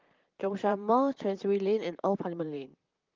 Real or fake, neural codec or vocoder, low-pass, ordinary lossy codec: fake; vocoder, 44.1 kHz, 128 mel bands, Pupu-Vocoder; 7.2 kHz; Opus, 32 kbps